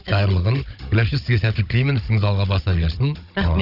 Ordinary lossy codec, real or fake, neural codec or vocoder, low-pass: none; fake; codec, 16 kHz, 16 kbps, FunCodec, trained on Chinese and English, 50 frames a second; 5.4 kHz